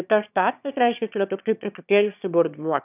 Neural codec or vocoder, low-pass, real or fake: autoencoder, 22.05 kHz, a latent of 192 numbers a frame, VITS, trained on one speaker; 3.6 kHz; fake